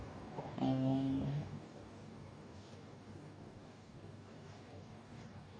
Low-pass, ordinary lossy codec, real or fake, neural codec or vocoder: 9.9 kHz; none; fake; codec, 44.1 kHz, 2.6 kbps, DAC